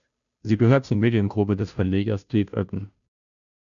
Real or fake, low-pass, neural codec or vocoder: fake; 7.2 kHz; codec, 16 kHz, 0.5 kbps, FunCodec, trained on Chinese and English, 25 frames a second